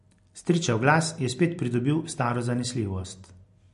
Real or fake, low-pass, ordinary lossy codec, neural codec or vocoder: real; 10.8 kHz; MP3, 48 kbps; none